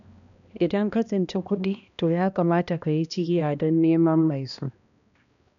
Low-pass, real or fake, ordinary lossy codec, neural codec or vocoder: 7.2 kHz; fake; none; codec, 16 kHz, 1 kbps, X-Codec, HuBERT features, trained on balanced general audio